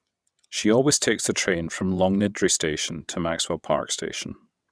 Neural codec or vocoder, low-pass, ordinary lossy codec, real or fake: vocoder, 22.05 kHz, 80 mel bands, WaveNeXt; none; none; fake